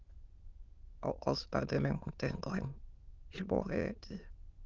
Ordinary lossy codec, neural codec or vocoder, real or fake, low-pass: Opus, 32 kbps; autoencoder, 22.05 kHz, a latent of 192 numbers a frame, VITS, trained on many speakers; fake; 7.2 kHz